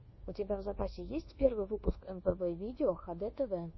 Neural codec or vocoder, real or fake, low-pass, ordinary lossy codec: codec, 24 kHz, 1.2 kbps, DualCodec; fake; 7.2 kHz; MP3, 24 kbps